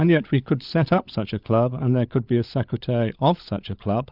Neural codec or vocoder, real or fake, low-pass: codec, 16 kHz, 16 kbps, FunCodec, trained on LibriTTS, 50 frames a second; fake; 5.4 kHz